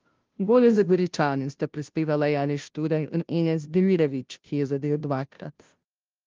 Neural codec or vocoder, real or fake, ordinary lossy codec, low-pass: codec, 16 kHz, 0.5 kbps, FunCodec, trained on Chinese and English, 25 frames a second; fake; Opus, 32 kbps; 7.2 kHz